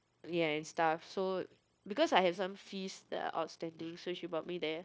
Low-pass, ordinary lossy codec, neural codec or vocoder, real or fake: none; none; codec, 16 kHz, 0.9 kbps, LongCat-Audio-Codec; fake